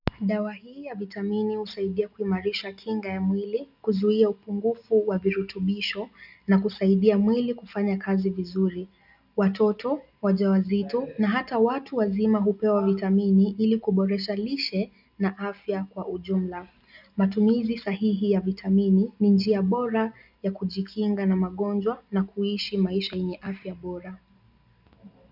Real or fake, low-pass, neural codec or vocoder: real; 5.4 kHz; none